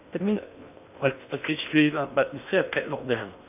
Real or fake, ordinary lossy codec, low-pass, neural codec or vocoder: fake; none; 3.6 kHz; codec, 16 kHz in and 24 kHz out, 0.6 kbps, FocalCodec, streaming, 2048 codes